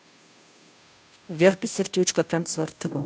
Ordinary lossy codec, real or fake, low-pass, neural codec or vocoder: none; fake; none; codec, 16 kHz, 0.5 kbps, FunCodec, trained on Chinese and English, 25 frames a second